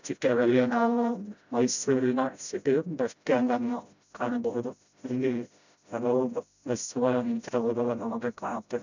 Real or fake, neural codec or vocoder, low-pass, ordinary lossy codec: fake; codec, 16 kHz, 0.5 kbps, FreqCodec, smaller model; 7.2 kHz; none